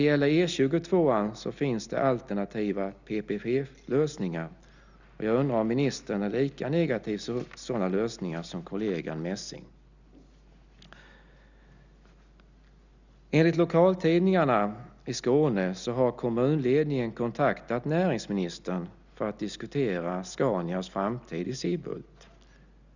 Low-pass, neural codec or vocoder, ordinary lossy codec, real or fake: 7.2 kHz; none; none; real